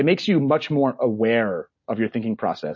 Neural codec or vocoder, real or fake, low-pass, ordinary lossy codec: none; real; 7.2 kHz; MP3, 32 kbps